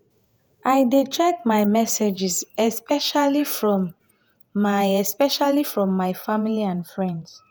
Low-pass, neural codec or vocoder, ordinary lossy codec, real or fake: none; vocoder, 48 kHz, 128 mel bands, Vocos; none; fake